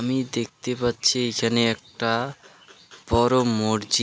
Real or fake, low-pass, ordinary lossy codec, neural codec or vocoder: real; none; none; none